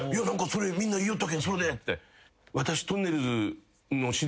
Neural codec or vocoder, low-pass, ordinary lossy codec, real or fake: none; none; none; real